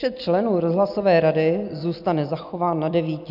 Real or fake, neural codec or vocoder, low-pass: real; none; 5.4 kHz